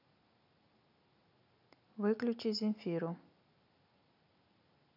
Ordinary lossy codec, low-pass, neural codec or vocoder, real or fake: none; 5.4 kHz; none; real